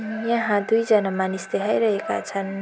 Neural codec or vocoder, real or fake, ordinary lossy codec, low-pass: none; real; none; none